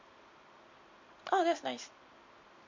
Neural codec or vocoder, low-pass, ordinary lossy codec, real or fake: none; 7.2 kHz; MP3, 48 kbps; real